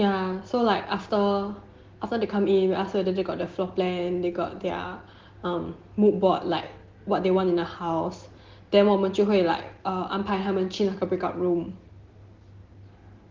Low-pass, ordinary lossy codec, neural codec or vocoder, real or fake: 7.2 kHz; Opus, 16 kbps; none; real